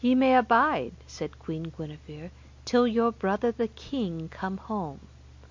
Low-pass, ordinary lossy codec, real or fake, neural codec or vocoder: 7.2 kHz; MP3, 48 kbps; real; none